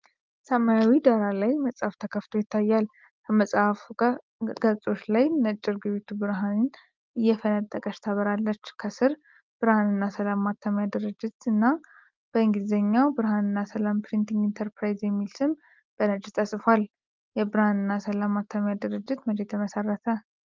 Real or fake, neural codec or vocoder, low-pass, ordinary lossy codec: real; none; 7.2 kHz; Opus, 24 kbps